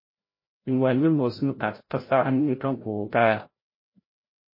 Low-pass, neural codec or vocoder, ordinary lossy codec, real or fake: 5.4 kHz; codec, 16 kHz, 0.5 kbps, FreqCodec, larger model; MP3, 24 kbps; fake